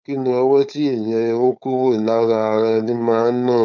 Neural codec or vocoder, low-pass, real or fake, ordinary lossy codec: codec, 16 kHz, 4.8 kbps, FACodec; 7.2 kHz; fake; none